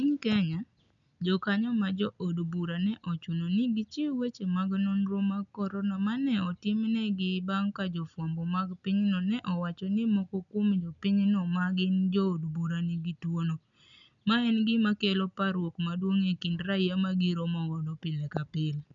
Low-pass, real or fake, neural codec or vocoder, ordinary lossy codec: 7.2 kHz; real; none; none